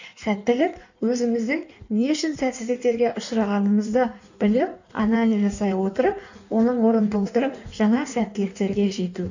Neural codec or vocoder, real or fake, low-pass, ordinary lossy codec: codec, 16 kHz in and 24 kHz out, 1.1 kbps, FireRedTTS-2 codec; fake; 7.2 kHz; none